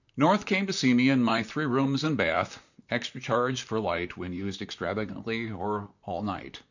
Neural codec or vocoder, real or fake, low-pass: vocoder, 44.1 kHz, 128 mel bands, Pupu-Vocoder; fake; 7.2 kHz